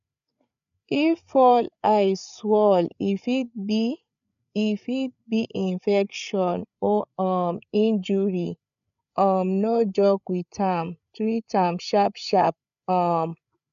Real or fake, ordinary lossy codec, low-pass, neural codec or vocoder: fake; none; 7.2 kHz; codec, 16 kHz, 8 kbps, FreqCodec, larger model